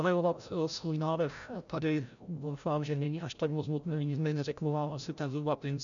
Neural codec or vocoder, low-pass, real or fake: codec, 16 kHz, 0.5 kbps, FreqCodec, larger model; 7.2 kHz; fake